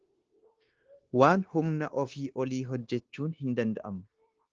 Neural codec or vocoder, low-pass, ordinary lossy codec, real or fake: codec, 16 kHz, 0.9 kbps, LongCat-Audio-Codec; 7.2 kHz; Opus, 16 kbps; fake